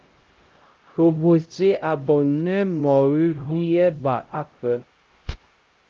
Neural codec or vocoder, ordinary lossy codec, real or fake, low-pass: codec, 16 kHz, 0.5 kbps, X-Codec, HuBERT features, trained on LibriSpeech; Opus, 32 kbps; fake; 7.2 kHz